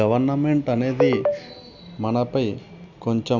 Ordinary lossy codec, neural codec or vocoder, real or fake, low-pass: none; none; real; 7.2 kHz